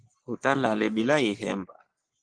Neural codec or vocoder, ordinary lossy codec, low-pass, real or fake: codec, 44.1 kHz, 3.4 kbps, Pupu-Codec; Opus, 24 kbps; 9.9 kHz; fake